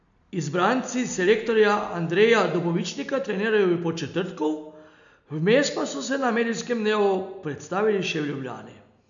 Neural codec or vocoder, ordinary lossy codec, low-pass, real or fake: none; none; 7.2 kHz; real